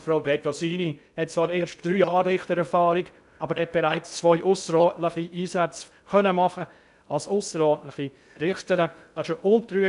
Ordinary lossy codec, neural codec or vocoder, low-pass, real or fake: none; codec, 16 kHz in and 24 kHz out, 0.6 kbps, FocalCodec, streaming, 2048 codes; 10.8 kHz; fake